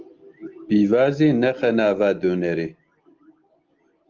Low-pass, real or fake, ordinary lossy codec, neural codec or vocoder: 7.2 kHz; real; Opus, 32 kbps; none